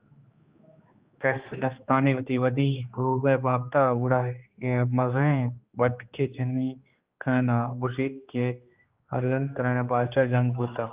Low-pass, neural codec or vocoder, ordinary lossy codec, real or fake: 3.6 kHz; codec, 16 kHz, 2 kbps, X-Codec, HuBERT features, trained on general audio; Opus, 32 kbps; fake